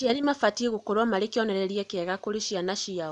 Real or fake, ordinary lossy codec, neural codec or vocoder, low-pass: real; none; none; 10.8 kHz